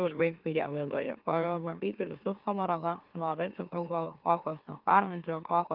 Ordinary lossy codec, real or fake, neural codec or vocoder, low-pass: Opus, 32 kbps; fake; autoencoder, 44.1 kHz, a latent of 192 numbers a frame, MeloTTS; 5.4 kHz